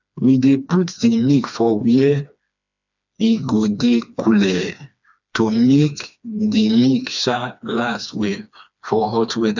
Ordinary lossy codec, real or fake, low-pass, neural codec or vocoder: none; fake; 7.2 kHz; codec, 16 kHz, 2 kbps, FreqCodec, smaller model